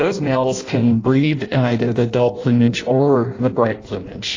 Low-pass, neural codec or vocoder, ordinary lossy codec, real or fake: 7.2 kHz; codec, 16 kHz in and 24 kHz out, 0.6 kbps, FireRedTTS-2 codec; AAC, 32 kbps; fake